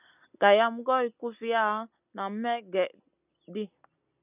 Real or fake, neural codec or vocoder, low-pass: real; none; 3.6 kHz